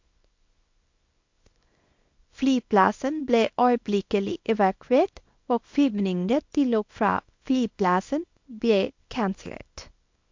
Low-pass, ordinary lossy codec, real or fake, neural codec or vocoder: 7.2 kHz; MP3, 48 kbps; fake; codec, 24 kHz, 0.9 kbps, WavTokenizer, small release